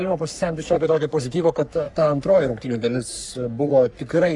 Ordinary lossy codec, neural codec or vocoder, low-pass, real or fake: Opus, 64 kbps; codec, 44.1 kHz, 3.4 kbps, Pupu-Codec; 10.8 kHz; fake